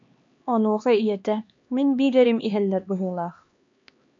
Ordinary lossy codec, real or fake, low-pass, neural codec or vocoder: MP3, 64 kbps; fake; 7.2 kHz; codec, 16 kHz, 2 kbps, X-Codec, HuBERT features, trained on LibriSpeech